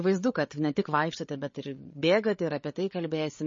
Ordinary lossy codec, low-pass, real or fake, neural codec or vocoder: MP3, 32 kbps; 7.2 kHz; fake; codec, 16 kHz, 8 kbps, FreqCodec, larger model